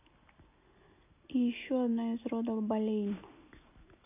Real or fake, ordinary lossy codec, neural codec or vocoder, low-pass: real; AAC, 32 kbps; none; 3.6 kHz